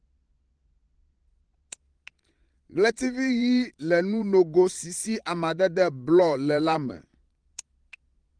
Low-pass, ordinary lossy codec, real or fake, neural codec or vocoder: 9.9 kHz; Opus, 24 kbps; fake; vocoder, 22.05 kHz, 80 mel bands, Vocos